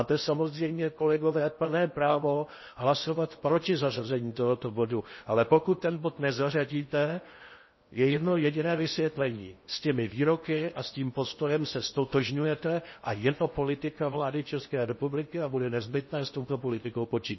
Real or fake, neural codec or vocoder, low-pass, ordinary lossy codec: fake; codec, 16 kHz in and 24 kHz out, 0.8 kbps, FocalCodec, streaming, 65536 codes; 7.2 kHz; MP3, 24 kbps